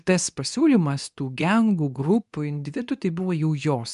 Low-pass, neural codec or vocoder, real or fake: 10.8 kHz; codec, 24 kHz, 0.9 kbps, WavTokenizer, medium speech release version 2; fake